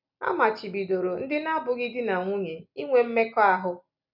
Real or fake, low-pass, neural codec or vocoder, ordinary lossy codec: real; 5.4 kHz; none; none